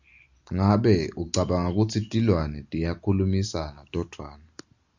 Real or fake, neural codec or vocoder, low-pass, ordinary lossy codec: real; none; 7.2 kHz; Opus, 64 kbps